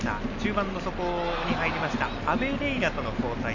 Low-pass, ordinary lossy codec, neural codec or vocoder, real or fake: 7.2 kHz; none; none; real